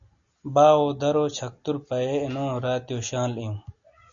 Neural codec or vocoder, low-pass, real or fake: none; 7.2 kHz; real